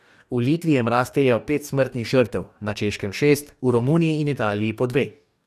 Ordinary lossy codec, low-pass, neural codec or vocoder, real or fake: none; 14.4 kHz; codec, 44.1 kHz, 2.6 kbps, DAC; fake